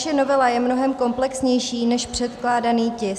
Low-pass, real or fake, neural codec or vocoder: 14.4 kHz; real; none